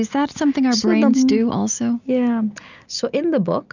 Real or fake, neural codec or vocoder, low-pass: real; none; 7.2 kHz